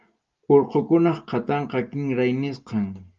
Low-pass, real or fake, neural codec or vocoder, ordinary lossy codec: 7.2 kHz; real; none; Opus, 32 kbps